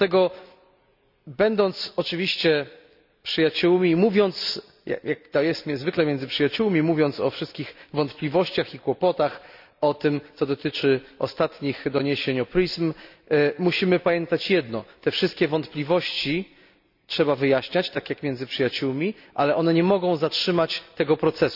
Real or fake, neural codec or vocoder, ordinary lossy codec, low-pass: real; none; none; 5.4 kHz